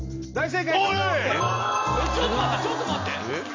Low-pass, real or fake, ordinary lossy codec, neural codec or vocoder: 7.2 kHz; real; MP3, 64 kbps; none